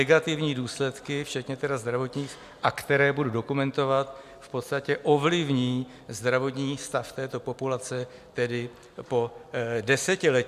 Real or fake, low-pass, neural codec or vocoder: real; 14.4 kHz; none